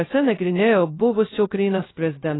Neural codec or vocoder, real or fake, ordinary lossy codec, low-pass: codec, 16 kHz, 0.2 kbps, FocalCodec; fake; AAC, 16 kbps; 7.2 kHz